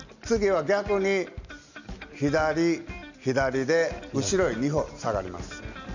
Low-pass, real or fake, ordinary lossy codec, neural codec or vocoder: 7.2 kHz; real; none; none